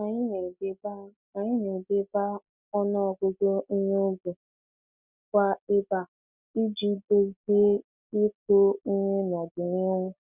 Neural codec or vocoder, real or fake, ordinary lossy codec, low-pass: none; real; none; 3.6 kHz